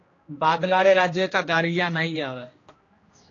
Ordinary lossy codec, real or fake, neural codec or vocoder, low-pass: AAC, 32 kbps; fake; codec, 16 kHz, 1 kbps, X-Codec, HuBERT features, trained on general audio; 7.2 kHz